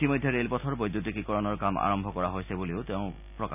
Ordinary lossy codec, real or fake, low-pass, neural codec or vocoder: none; real; 3.6 kHz; none